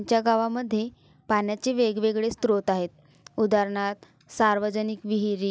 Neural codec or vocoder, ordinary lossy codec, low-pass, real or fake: none; none; none; real